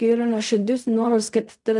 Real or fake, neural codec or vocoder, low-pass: fake; codec, 16 kHz in and 24 kHz out, 0.4 kbps, LongCat-Audio-Codec, fine tuned four codebook decoder; 10.8 kHz